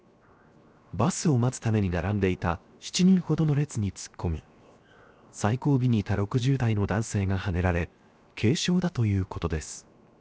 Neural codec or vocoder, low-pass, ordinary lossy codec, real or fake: codec, 16 kHz, 0.7 kbps, FocalCodec; none; none; fake